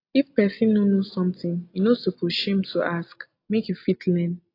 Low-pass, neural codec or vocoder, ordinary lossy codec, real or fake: 5.4 kHz; none; AAC, 32 kbps; real